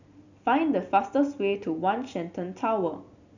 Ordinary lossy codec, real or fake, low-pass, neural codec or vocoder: none; real; 7.2 kHz; none